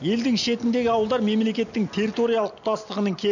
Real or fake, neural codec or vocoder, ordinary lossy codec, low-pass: real; none; none; 7.2 kHz